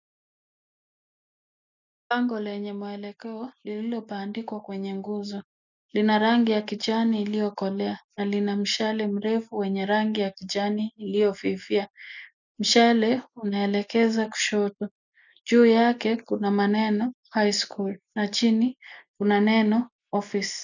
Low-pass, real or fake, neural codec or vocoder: 7.2 kHz; real; none